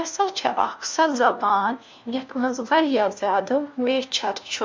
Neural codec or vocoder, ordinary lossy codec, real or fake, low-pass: codec, 16 kHz, 1 kbps, FunCodec, trained on LibriTTS, 50 frames a second; none; fake; none